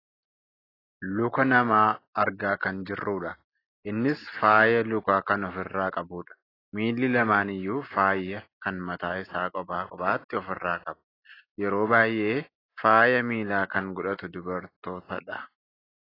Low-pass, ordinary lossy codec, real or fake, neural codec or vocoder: 5.4 kHz; AAC, 24 kbps; real; none